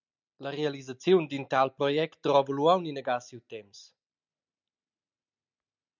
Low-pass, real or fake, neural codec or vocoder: 7.2 kHz; real; none